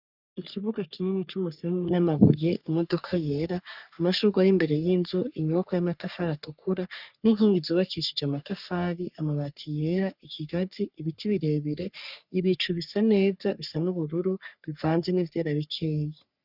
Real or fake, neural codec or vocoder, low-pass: fake; codec, 44.1 kHz, 3.4 kbps, Pupu-Codec; 5.4 kHz